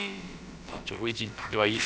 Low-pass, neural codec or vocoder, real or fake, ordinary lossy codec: none; codec, 16 kHz, about 1 kbps, DyCAST, with the encoder's durations; fake; none